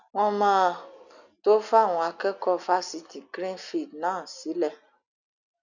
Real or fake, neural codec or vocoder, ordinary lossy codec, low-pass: real; none; none; 7.2 kHz